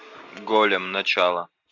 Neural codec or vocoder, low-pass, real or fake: none; 7.2 kHz; real